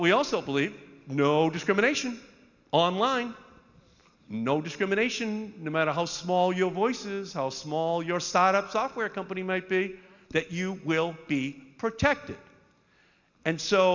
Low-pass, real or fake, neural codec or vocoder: 7.2 kHz; real; none